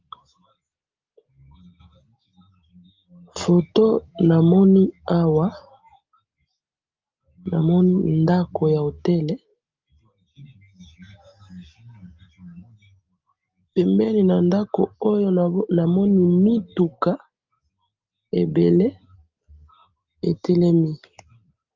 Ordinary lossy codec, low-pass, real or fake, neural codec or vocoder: Opus, 24 kbps; 7.2 kHz; real; none